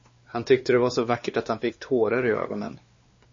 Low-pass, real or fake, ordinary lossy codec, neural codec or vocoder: 7.2 kHz; fake; MP3, 32 kbps; codec, 16 kHz, 2 kbps, X-Codec, WavLM features, trained on Multilingual LibriSpeech